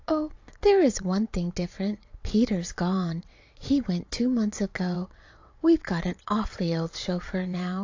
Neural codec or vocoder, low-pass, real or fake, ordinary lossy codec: vocoder, 22.05 kHz, 80 mel bands, WaveNeXt; 7.2 kHz; fake; AAC, 48 kbps